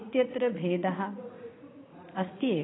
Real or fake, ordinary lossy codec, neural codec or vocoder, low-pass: fake; AAC, 16 kbps; vocoder, 44.1 kHz, 80 mel bands, Vocos; 7.2 kHz